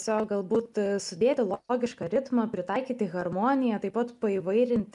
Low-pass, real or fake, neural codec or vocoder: 10.8 kHz; real; none